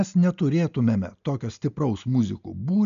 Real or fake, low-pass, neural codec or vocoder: real; 7.2 kHz; none